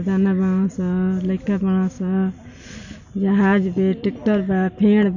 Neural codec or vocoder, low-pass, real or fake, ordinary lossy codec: none; 7.2 kHz; real; none